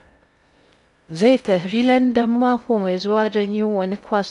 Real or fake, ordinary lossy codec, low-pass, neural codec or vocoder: fake; none; 10.8 kHz; codec, 16 kHz in and 24 kHz out, 0.6 kbps, FocalCodec, streaming, 2048 codes